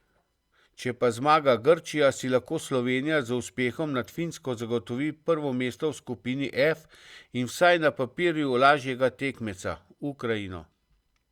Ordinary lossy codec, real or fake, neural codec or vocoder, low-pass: Opus, 64 kbps; real; none; 19.8 kHz